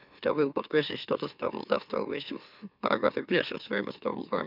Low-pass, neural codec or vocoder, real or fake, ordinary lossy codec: 5.4 kHz; autoencoder, 44.1 kHz, a latent of 192 numbers a frame, MeloTTS; fake; none